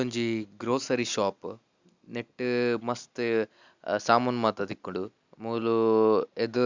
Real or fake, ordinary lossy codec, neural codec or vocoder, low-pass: real; Opus, 64 kbps; none; 7.2 kHz